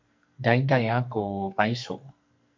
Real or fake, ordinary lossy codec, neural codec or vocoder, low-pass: fake; MP3, 64 kbps; codec, 44.1 kHz, 2.6 kbps, SNAC; 7.2 kHz